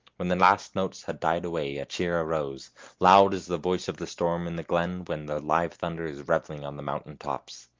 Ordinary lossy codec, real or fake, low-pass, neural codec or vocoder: Opus, 24 kbps; real; 7.2 kHz; none